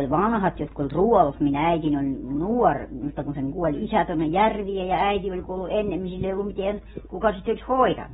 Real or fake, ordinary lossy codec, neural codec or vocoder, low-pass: real; AAC, 16 kbps; none; 19.8 kHz